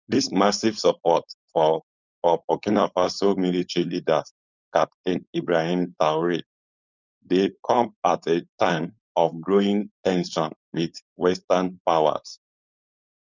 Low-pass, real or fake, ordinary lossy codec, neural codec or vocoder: 7.2 kHz; fake; none; codec, 16 kHz, 4.8 kbps, FACodec